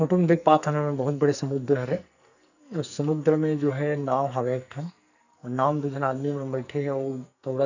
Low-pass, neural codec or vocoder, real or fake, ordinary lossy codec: 7.2 kHz; codec, 44.1 kHz, 2.6 kbps, SNAC; fake; none